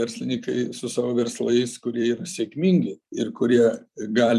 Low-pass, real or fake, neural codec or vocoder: 14.4 kHz; fake; vocoder, 44.1 kHz, 128 mel bands every 256 samples, BigVGAN v2